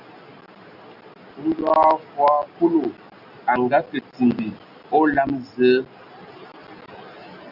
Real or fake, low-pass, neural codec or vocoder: real; 5.4 kHz; none